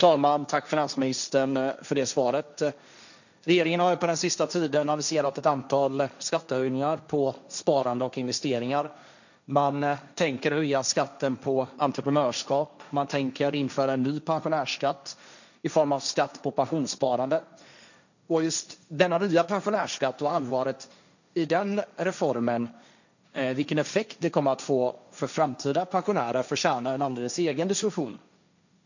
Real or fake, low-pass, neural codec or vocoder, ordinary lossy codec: fake; 7.2 kHz; codec, 16 kHz, 1.1 kbps, Voila-Tokenizer; none